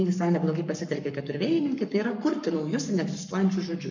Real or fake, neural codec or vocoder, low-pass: fake; codec, 44.1 kHz, 7.8 kbps, Pupu-Codec; 7.2 kHz